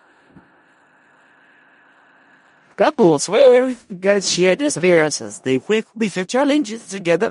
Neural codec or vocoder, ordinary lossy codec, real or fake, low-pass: codec, 16 kHz in and 24 kHz out, 0.4 kbps, LongCat-Audio-Codec, four codebook decoder; MP3, 48 kbps; fake; 10.8 kHz